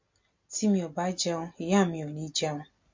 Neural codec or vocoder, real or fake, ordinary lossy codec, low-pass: none; real; MP3, 48 kbps; 7.2 kHz